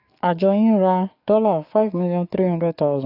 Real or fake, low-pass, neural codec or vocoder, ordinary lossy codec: fake; 5.4 kHz; codec, 44.1 kHz, 7.8 kbps, DAC; AAC, 32 kbps